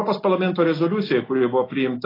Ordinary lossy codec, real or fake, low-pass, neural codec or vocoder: AAC, 24 kbps; real; 5.4 kHz; none